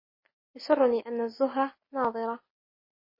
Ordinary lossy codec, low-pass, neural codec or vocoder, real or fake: MP3, 24 kbps; 5.4 kHz; none; real